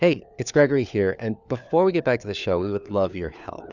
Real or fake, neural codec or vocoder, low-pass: fake; codec, 16 kHz, 4 kbps, FunCodec, trained on LibriTTS, 50 frames a second; 7.2 kHz